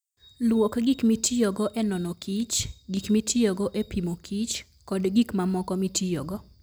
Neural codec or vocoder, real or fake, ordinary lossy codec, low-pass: none; real; none; none